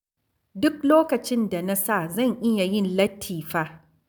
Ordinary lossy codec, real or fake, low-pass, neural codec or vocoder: none; real; none; none